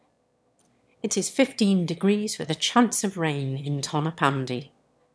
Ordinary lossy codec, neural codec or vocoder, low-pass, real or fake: none; autoencoder, 22.05 kHz, a latent of 192 numbers a frame, VITS, trained on one speaker; none; fake